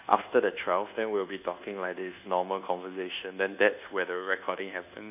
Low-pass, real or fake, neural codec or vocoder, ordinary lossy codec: 3.6 kHz; fake; codec, 24 kHz, 1.2 kbps, DualCodec; none